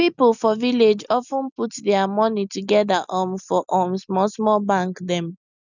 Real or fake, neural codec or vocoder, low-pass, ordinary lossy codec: real; none; 7.2 kHz; none